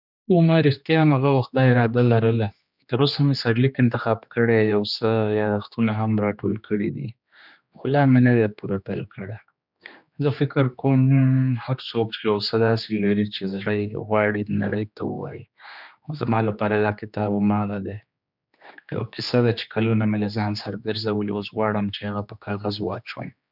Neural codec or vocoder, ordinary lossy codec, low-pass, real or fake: codec, 16 kHz, 2 kbps, X-Codec, HuBERT features, trained on general audio; none; 5.4 kHz; fake